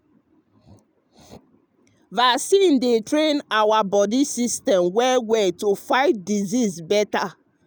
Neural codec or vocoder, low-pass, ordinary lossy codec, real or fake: none; none; none; real